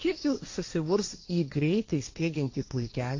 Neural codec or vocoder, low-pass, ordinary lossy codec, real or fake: codec, 16 kHz, 1.1 kbps, Voila-Tokenizer; 7.2 kHz; AAC, 48 kbps; fake